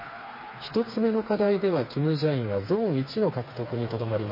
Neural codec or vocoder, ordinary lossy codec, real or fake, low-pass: codec, 16 kHz, 4 kbps, FreqCodec, smaller model; MP3, 24 kbps; fake; 5.4 kHz